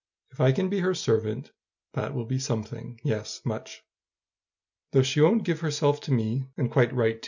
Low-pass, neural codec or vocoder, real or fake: 7.2 kHz; none; real